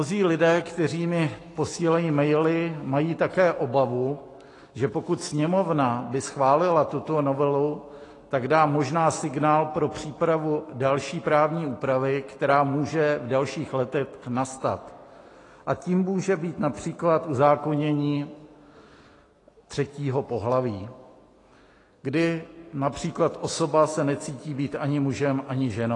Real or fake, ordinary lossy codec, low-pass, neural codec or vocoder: fake; AAC, 32 kbps; 10.8 kHz; autoencoder, 48 kHz, 128 numbers a frame, DAC-VAE, trained on Japanese speech